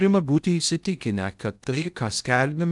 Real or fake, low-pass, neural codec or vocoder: fake; 10.8 kHz; codec, 16 kHz in and 24 kHz out, 0.6 kbps, FocalCodec, streaming, 2048 codes